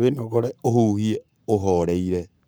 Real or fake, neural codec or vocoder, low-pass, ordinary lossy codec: fake; codec, 44.1 kHz, 7.8 kbps, Pupu-Codec; none; none